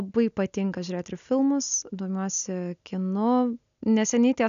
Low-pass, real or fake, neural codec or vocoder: 7.2 kHz; real; none